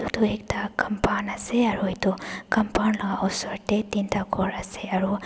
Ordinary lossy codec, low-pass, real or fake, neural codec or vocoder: none; none; real; none